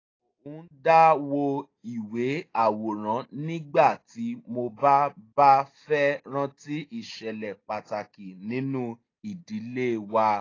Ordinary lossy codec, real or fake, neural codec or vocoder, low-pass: AAC, 32 kbps; real; none; 7.2 kHz